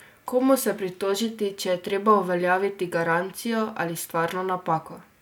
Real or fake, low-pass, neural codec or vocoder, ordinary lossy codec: real; none; none; none